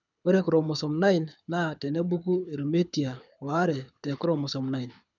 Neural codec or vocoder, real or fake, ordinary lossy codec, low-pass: codec, 24 kHz, 6 kbps, HILCodec; fake; none; 7.2 kHz